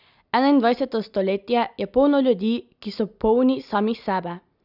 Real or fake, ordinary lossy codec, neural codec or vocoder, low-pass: real; none; none; 5.4 kHz